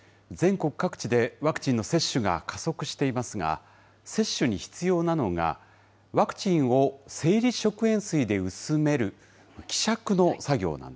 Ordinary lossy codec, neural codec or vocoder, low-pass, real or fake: none; none; none; real